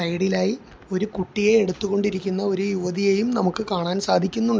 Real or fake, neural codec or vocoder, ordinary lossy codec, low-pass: real; none; none; none